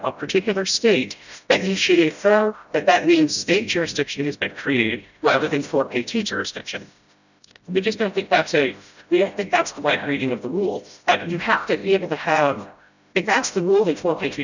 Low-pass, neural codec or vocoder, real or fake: 7.2 kHz; codec, 16 kHz, 0.5 kbps, FreqCodec, smaller model; fake